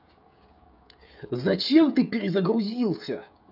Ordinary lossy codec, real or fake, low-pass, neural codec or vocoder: none; fake; 5.4 kHz; codec, 16 kHz, 16 kbps, FreqCodec, smaller model